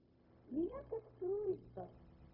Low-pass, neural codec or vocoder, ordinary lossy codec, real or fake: 5.4 kHz; codec, 16 kHz, 0.4 kbps, LongCat-Audio-Codec; AAC, 32 kbps; fake